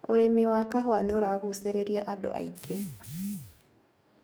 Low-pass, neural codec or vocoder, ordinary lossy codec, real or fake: none; codec, 44.1 kHz, 2.6 kbps, DAC; none; fake